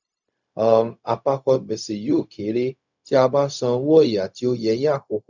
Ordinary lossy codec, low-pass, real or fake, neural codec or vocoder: none; 7.2 kHz; fake; codec, 16 kHz, 0.4 kbps, LongCat-Audio-Codec